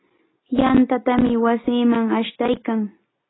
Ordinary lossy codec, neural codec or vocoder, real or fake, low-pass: AAC, 16 kbps; none; real; 7.2 kHz